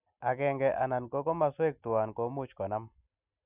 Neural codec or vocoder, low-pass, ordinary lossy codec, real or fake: none; 3.6 kHz; none; real